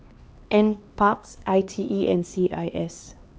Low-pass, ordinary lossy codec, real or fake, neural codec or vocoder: none; none; fake; codec, 16 kHz, 2 kbps, X-Codec, HuBERT features, trained on LibriSpeech